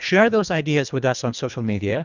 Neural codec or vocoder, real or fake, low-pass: codec, 24 kHz, 1.5 kbps, HILCodec; fake; 7.2 kHz